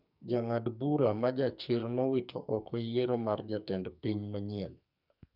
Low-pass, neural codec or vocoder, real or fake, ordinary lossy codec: 5.4 kHz; codec, 44.1 kHz, 2.6 kbps, SNAC; fake; none